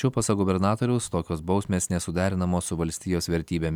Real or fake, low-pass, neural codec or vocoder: real; 19.8 kHz; none